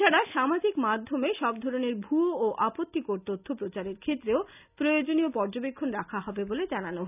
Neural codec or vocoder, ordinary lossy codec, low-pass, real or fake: none; none; 3.6 kHz; real